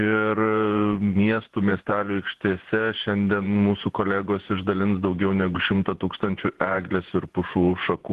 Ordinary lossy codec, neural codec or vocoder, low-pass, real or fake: Opus, 16 kbps; vocoder, 48 kHz, 128 mel bands, Vocos; 14.4 kHz; fake